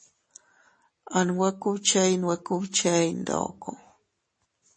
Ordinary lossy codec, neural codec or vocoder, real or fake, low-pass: MP3, 32 kbps; none; real; 9.9 kHz